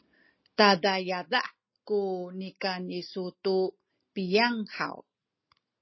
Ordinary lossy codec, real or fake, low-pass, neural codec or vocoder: MP3, 24 kbps; real; 7.2 kHz; none